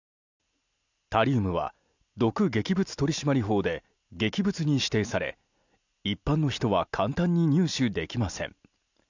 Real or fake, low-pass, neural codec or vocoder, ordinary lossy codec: real; 7.2 kHz; none; none